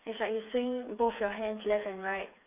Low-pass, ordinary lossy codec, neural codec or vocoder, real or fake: 3.6 kHz; none; codec, 16 kHz, 4 kbps, FreqCodec, smaller model; fake